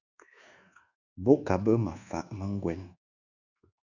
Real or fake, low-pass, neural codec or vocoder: fake; 7.2 kHz; codec, 24 kHz, 1.2 kbps, DualCodec